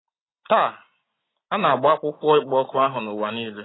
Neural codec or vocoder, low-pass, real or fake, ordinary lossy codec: none; 7.2 kHz; real; AAC, 16 kbps